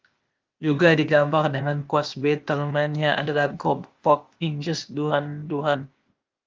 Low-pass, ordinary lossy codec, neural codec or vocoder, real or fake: 7.2 kHz; Opus, 32 kbps; codec, 16 kHz, 0.8 kbps, ZipCodec; fake